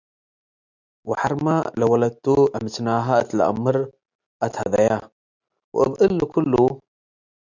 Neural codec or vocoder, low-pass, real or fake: none; 7.2 kHz; real